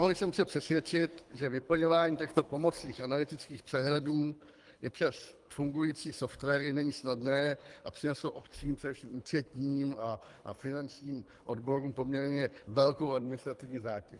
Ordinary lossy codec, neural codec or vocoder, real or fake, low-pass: Opus, 32 kbps; codec, 24 kHz, 3 kbps, HILCodec; fake; 10.8 kHz